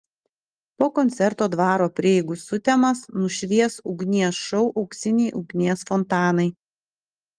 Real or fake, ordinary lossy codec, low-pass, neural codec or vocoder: real; Opus, 24 kbps; 9.9 kHz; none